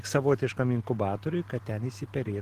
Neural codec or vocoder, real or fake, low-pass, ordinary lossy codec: none; real; 14.4 kHz; Opus, 16 kbps